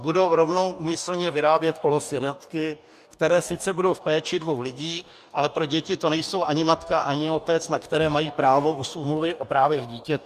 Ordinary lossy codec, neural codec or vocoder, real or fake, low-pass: MP3, 96 kbps; codec, 44.1 kHz, 2.6 kbps, DAC; fake; 14.4 kHz